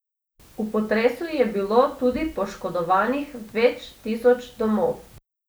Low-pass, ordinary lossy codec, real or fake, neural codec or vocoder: none; none; real; none